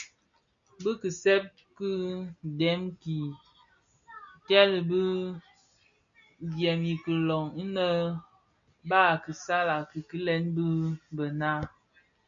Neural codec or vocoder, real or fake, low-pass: none; real; 7.2 kHz